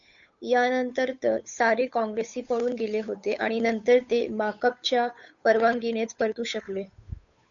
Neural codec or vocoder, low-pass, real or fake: codec, 16 kHz, 8 kbps, FunCodec, trained on Chinese and English, 25 frames a second; 7.2 kHz; fake